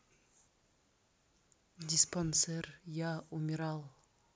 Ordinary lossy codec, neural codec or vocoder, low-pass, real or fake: none; none; none; real